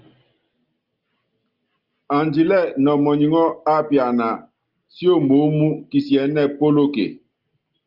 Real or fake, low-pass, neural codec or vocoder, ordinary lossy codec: real; 5.4 kHz; none; Opus, 32 kbps